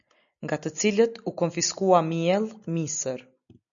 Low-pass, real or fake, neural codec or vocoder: 7.2 kHz; real; none